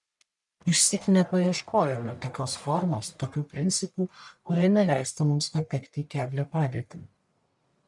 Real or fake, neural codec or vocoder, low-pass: fake; codec, 44.1 kHz, 1.7 kbps, Pupu-Codec; 10.8 kHz